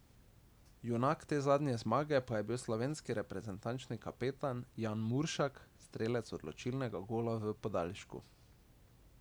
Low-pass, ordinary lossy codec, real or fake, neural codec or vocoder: none; none; real; none